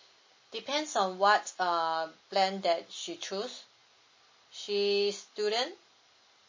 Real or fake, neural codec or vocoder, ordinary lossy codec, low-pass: real; none; MP3, 32 kbps; 7.2 kHz